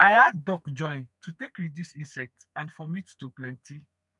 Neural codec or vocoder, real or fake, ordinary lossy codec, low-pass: codec, 32 kHz, 1.9 kbps, SNAC; fake; none; 10.8 kHz